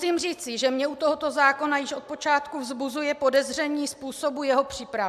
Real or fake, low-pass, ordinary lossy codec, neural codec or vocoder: real; 14.4 kHz; AAC, 96 kbps; none